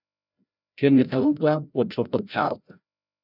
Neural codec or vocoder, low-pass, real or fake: codec, 16 kHz, 0.5 kbps, FreqCodec, larger model; 5.4 kHz; fake